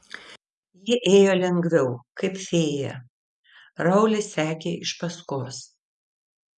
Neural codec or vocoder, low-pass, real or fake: none; 10.8 kHz; real